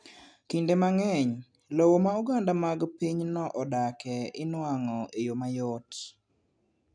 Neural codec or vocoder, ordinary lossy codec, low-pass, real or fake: none; none; 9.9 kHz; real